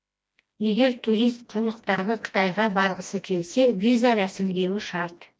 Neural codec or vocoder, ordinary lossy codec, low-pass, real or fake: codec, 16 kHz, 1 kbps, FreqCodec, smaller model; none; none; fake